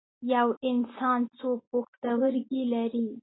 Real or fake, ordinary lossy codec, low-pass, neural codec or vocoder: real; AAC, 16 kbps; 7.2 kHz; none